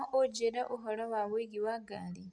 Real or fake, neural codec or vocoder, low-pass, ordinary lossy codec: real; none; 9.9 kHz; none